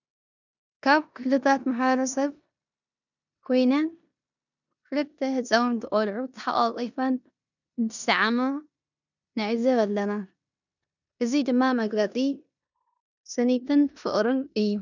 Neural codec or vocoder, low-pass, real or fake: codec, 16 kHz in and 24 kHz out, 0.9 kbps, LongCat-Audio-Codec, four codebook decoder; 7.2 kHz; fake